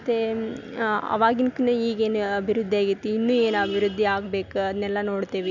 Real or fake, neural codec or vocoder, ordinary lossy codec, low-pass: real; none; none; 7.2 kHz